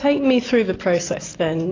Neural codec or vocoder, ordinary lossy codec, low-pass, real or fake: none; AAC, 32 kbps; 7.2 kHz; real